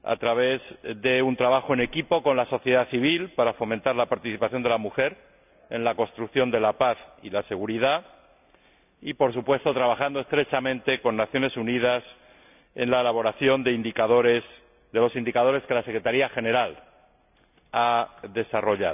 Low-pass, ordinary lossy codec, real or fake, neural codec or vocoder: 3.6 kHz; none; real; none